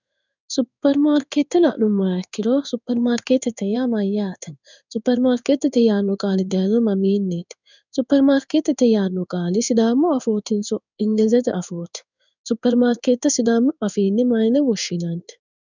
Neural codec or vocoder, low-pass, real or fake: codec, 16 kHz in and 24 kHz out, 1 kbps, XY-Tokenizer; 7.2 kHz; fake